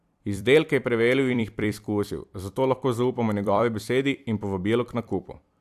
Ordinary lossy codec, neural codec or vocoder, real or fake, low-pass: AAC, 96 kbps; vocoder, 44.1 kHz, 128 mel bands every 256 samples, BigVGAN v2; fake; 14.4 kHz